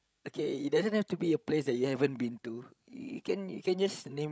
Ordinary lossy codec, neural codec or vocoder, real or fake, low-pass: none; codec, 16 kHz, 16 kbps, FreqCodec, larger model; fake; none